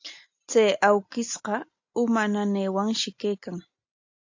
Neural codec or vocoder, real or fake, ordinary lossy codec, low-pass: none; real; AAC, 48 kbps; 7.2 kHz